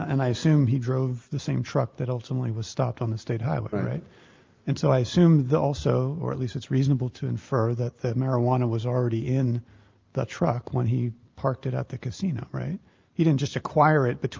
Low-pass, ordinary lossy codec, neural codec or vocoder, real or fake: 7.2 kHz; Opus, 32 kbps; none; real